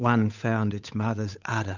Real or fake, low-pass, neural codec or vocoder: fake; 7.2 kHz; codec, 16 kHz, 8 kbps, FunCodec, trained on Chinese and English, 25 frames a second